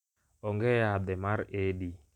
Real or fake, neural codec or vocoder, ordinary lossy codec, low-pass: real; none; none; 19.8 kHz